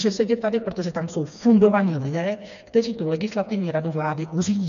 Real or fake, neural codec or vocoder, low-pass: fake; codec, 16 kHz, 2 kbps, FreqCodec, smaller model; 7.2 kHz